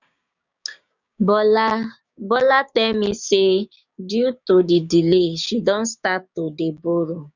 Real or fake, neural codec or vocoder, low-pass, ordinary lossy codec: fake; codec, 16 kHz, 6 kbps, DAC; 7.2 kHz; none